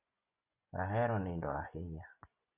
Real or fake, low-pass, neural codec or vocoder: fake; 3.6 kHz; vocoder, 24 kHz, 100 mel bands, Vocos